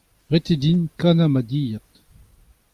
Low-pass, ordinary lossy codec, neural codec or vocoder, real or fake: 14.4 kHz; Opus, 32 kbps; none; real